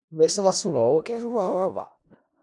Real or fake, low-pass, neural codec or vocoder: fake; 10.8 kHz; codec, 16 kHz in and 24 kHz out, 0.4 kbps, LongCat-Audio-Codec, four codebook decoder